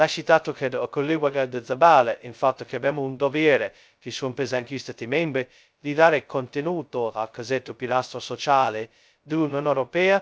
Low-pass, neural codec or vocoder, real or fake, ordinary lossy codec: none; codec, 16 kHz, 0.2 kbps, FocalCodec; fake; none